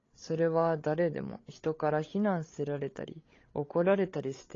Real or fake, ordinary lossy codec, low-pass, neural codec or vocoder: fake; AAC, 32 kbps; 7.2 kHz; codec, 16 kHz, 16 kbps, FreqCodec, larger model